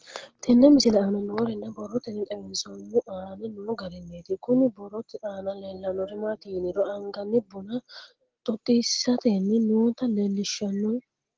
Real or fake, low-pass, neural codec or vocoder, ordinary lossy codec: real; 7.2 kHz; none; Opus, 16 kbps